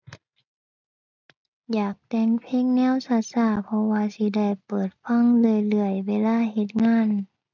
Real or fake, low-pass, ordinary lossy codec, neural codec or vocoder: real; 7.2 kHz; none; none